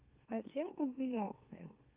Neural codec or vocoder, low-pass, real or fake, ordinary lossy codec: autoencoder, 44.1 kHz, a latent of 192 numbers a frame, MeloTTS; 3.6 kHz; fake; Opus, 24 kbps